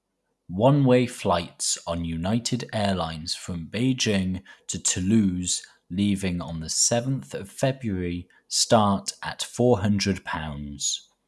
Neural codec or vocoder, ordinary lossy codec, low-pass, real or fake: none; none; none; real